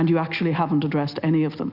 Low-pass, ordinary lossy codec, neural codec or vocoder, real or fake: 5.4 kHz; Opus, 64 kbps; none; real